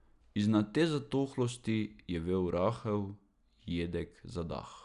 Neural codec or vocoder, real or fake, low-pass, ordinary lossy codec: none; real; 10.8 kHz; none